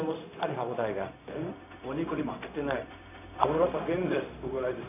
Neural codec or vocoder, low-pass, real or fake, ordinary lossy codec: codec, 16 kHz, 0.4 kbps, LongCat-Audio-Codec; 3.6 kHz; fake; none